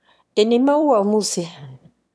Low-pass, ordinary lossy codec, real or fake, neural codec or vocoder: none; none; fake; autoencoder, 22.05 kHz, a latent of 192 numbers a frame, VITS, trained on one speaker